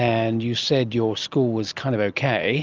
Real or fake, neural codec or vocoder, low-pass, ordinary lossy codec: real; none; 7.2 kHz; Opus, 24 kbps